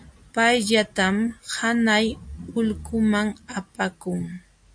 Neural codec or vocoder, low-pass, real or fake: none; 9.9 kHz; real